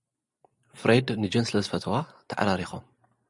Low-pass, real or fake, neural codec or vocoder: 10.8 kHz; real; none